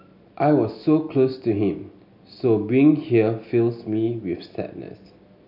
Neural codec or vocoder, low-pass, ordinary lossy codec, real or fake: none; 5.4 kHz; none; real